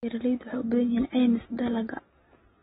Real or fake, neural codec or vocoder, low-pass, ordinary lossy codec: real; none; 9.9 kHz; AAC, 16 kbps